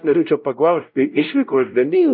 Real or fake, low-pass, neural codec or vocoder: fake; 5.4 kHz; codec, 16 kHz, 0.5 kbps, X-Codec, WavLM features, trained on Multilingual LibriSpeech